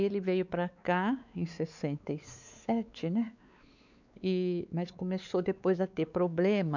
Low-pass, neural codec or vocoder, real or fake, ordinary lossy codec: 7.2 kHz; codec, 16 kHz, 4 kbps, X-Codec, WavLM features, trained on Multilingual LibriSpeech; fake; none